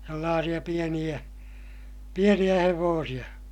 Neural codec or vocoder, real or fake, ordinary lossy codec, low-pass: none; real; none; 19.8 kHz